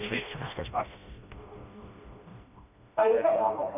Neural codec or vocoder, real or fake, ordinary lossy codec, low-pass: codec, 16 kHz, 1 kbps, FreqCodec, smaller model; fake; none; 3.6 kHz